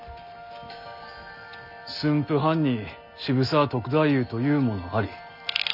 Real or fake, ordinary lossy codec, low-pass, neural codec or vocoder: real; none; 5.4 kHz; none